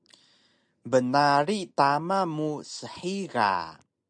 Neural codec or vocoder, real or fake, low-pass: none; real; 9.9 kHz